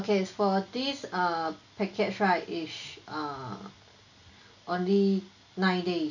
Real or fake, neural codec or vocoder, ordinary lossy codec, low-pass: real; none; none; 7.2 kHz